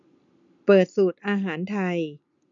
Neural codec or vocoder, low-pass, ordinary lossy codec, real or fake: none; 7.2 kHz; none; real